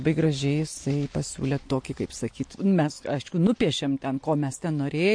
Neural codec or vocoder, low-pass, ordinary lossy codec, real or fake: none; 9.9 kHz; MP3, 48 kbps; real